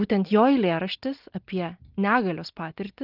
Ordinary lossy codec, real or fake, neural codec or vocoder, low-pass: Opus, 32 kbps; real; none; 5.4 kHz